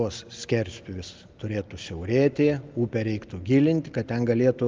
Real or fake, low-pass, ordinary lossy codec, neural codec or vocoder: real; 7.2 kHz; Opus, 24 kbps; none